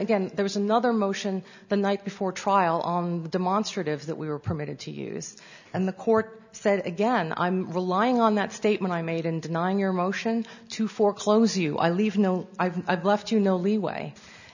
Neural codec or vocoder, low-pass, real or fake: none; 7.2 kHz; real